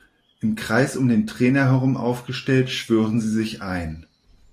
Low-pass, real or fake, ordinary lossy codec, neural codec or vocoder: 14.4 kHz; real; AAC, 64 kbps; none